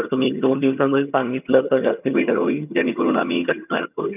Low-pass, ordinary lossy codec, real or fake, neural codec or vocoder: 3.6 kHz; none; fake; vocoder, 22.05 kHz, 80 mel bands, HiFi-GAN